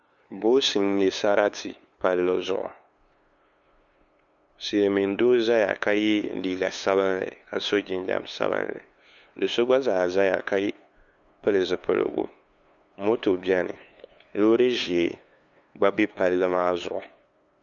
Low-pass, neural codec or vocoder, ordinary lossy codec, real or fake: 7.2 kHz; codec, 16 kHz, 2 kbps, FunCodec, trained on LibriTTS, 25 frames a second; MP3, 96 kbps; fake